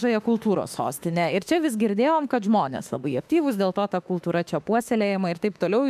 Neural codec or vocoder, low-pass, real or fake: autoencoder, 48 kHz, 32 numbers a frame, DAC-VAE, trained on Japanese speech; 14.4 kHz; fake